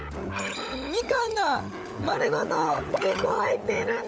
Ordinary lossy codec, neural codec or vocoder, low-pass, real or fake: none; codec, 16 kHz, 16 kbps, FunCodec, trained on LibriTTS, 50 frames a second; none; fake